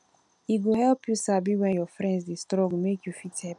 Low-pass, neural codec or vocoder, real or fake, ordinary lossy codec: 10.8 kHz; none; real; none